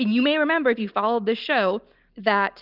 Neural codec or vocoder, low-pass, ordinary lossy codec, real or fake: none; 5.4 kHz; Opus, 24 kbps; real